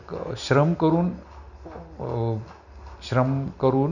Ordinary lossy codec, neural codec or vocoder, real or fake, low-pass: none; none; real; 7.2 kHz